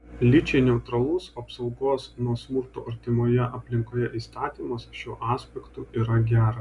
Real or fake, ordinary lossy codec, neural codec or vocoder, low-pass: real; Opus, 64 kbps; none; 10.8 kHz